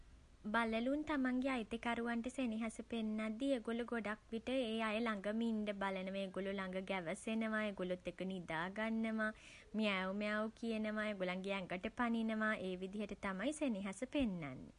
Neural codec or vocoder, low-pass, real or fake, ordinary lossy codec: none; 10.8 kHz; real; MP3, 48 kbps